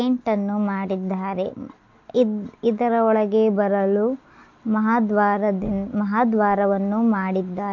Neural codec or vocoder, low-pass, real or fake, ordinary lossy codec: none; 7.2 kHz; real; MP3, 48 kbps